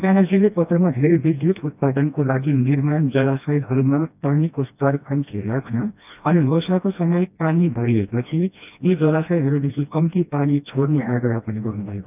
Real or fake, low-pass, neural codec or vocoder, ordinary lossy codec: fake; 3.6 kHz; codec, 16 kHz, 1 kbps, FreqCodec, smaller model; none